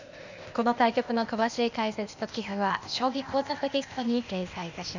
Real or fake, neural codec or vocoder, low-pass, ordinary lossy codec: fake; codec, 16 kHz, 0.8 kbps, ZipCodec; 7.2 kHz; none